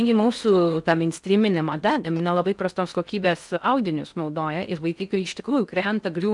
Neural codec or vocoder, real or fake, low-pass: codec, 16 kHz in and 24 kHz out, 0.6 kbps, FocalCodec, streaming, 4096 codes; fake; 10.8 kHz